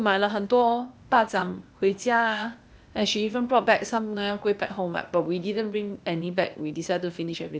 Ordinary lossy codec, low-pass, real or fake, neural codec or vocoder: none; none; fake; codec, 16 kHz, 0.8 kbps, ZipCodec